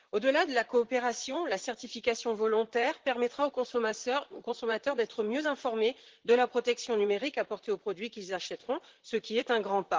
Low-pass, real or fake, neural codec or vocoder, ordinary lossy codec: 7.2 kHz; fake; vocoder, 44.1 kHz, 128 mel bands, Pupu-Vocoder; Opus, 16 kbps